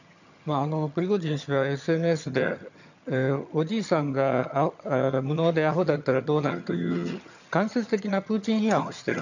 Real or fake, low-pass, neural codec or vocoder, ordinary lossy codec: fake; 7.2 kHz; vocoder, 22.05 kHz, 80 mel bands, HiFi-GAN; none